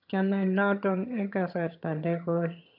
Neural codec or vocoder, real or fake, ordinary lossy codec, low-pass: vocoder, 22.05 kHz, 80 mel bands, HiFi-GAN; fake; none; 5.4 kHz